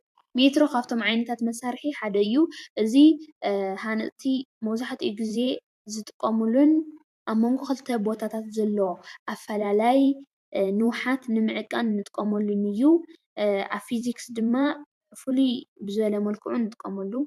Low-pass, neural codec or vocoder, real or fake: 14.4 kHz; vocoder, 44.1 kHz, 128 mel bands every 512 samples, BigVGAN v2; fake